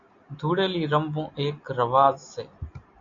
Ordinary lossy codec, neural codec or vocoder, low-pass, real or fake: MP3, 48 kbps; none; 7.2 kHz; real